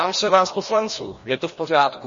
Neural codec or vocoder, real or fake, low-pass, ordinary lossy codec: codec, 16 kHz, 1 kbps, FreqCodec, larger model; fake; 7.2 kHz; MP3, 32 kbps